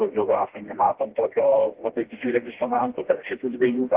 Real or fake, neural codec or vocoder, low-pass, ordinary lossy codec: fake; codec, 16 kHz, 1 kbps, FreqCodec, smaller model; 3.6 kHz; Opus, 16 kbps